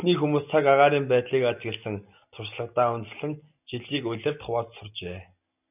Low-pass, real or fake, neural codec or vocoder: 3.6 kHz; real; none